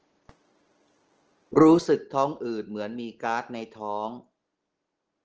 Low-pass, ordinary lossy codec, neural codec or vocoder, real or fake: 7.2 kHz; Opus, 16 kbps; none; real